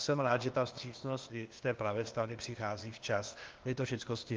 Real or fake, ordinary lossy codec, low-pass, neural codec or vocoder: fake; Opus, 32 kbps; 7.2 kHz; codec, 16 kHz, 0.8 kbps, ZipCodec